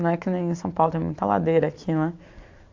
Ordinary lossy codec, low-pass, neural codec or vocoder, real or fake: AAC, 48 kbps; 7.2 kHz; none; real